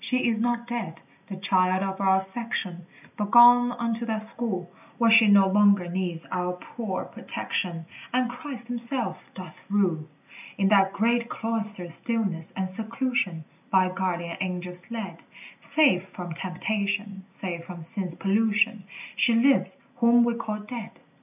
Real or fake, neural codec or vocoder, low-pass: real; none; 3.6 kHz